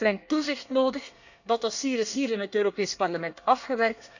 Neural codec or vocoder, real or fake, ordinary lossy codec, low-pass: codec, 24 kHz, 1 kbps, SNAC; fake; none; 7.2 kHz